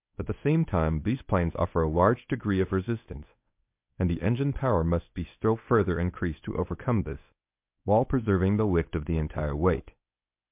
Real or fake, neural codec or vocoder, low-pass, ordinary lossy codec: fake; codec, 24 kHz, 0.9 kbps, WavTokenizer, medium speech release version 1; 3.6 kHz; MP3, 32 kbps